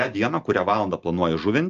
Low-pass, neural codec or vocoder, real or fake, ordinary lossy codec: 7.2 kHz; none; real; Opus, 24 kbps